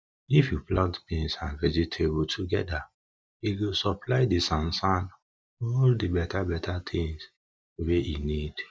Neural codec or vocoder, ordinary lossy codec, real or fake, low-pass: none; none; real; none